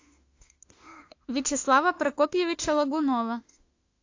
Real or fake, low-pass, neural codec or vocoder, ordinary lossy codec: fake; 7.2 kHz; autoencoder, 48 kHz, 32 numbers a frame, DAC-VAE, trained on Japanese speech; AAC, 48 kbps